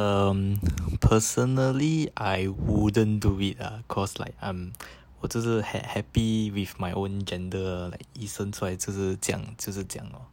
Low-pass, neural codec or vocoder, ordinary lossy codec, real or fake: 19.8 kHz; none; none; real